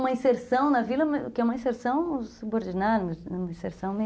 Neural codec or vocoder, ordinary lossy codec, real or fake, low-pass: none; none; real; none